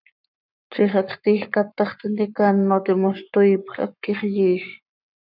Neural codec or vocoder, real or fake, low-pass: codec, 44.1 kHz, 7.8 kbps, Pupu-Codec; fake; 5.4 kHz